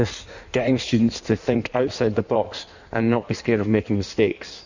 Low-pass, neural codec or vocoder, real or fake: 7.2 kHz; codec, 16 kHz in and 24 kHz out, 1.1 kbps, FireRedTTS-2 codec; fake